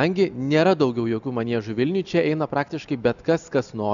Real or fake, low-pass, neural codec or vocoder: real; 7.2 kHz; none